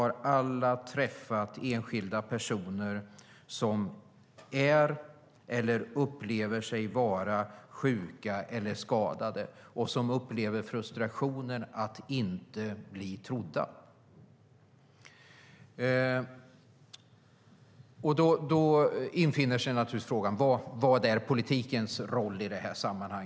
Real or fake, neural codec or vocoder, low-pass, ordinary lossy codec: real; none; none; none